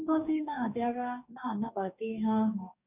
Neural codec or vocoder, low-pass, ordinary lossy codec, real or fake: codec, 44.1 kHz, 2.6 kbps, DAC; 3.6 kHz; none; fake